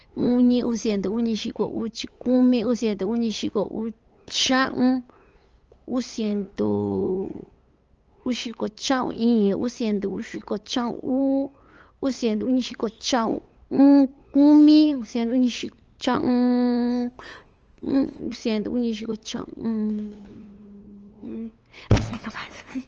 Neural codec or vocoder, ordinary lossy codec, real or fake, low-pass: codec, 16 kHz, 8 kbps, FunCodec, trained on LibriTTS, 25 frames a second; Opus, 24 kbps; fake; 7.2 kHz